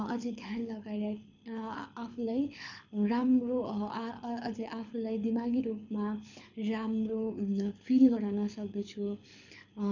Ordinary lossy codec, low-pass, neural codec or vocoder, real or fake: none; 7.2 kHz; codec, 24 kHz, 6 kbps, HILCodec; fake